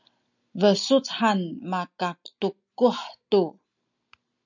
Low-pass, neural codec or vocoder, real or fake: 7.2 kHz; none; real